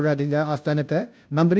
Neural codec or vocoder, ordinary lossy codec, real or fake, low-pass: codec, 16 kHz, 0.5 kbps, FunCodec, trained on Chinese and English, 25 frames a second; none; fake; none